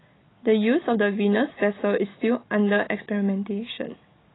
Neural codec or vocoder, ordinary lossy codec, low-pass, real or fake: none; AAC, 16 kbps; 7.2 kHz; real